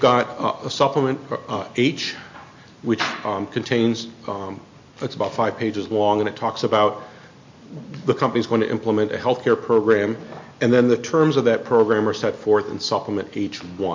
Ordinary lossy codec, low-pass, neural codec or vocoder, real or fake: MP3, 48 kbps; 7.2 kHz; none; real